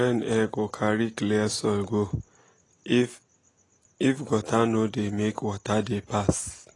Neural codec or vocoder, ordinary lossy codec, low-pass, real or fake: none; AAC, 32 kbps; 10.8 kHz; real